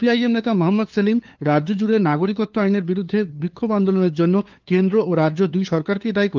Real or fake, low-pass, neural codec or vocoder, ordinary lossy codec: fake; 7.2 kHz; codec, 16 kHz, 2 kbps, FunCodec, trained on LibriTTS, 25 frames a second; Opus, 32 kbps